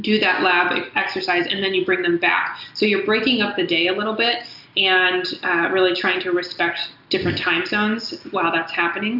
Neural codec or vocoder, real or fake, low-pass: none; real; 5.4 kHz